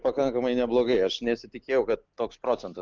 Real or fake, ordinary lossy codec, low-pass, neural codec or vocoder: real; Opus, 24 kbps; 7.2 kHz; none